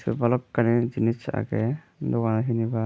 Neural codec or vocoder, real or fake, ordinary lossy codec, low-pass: none; real; none; none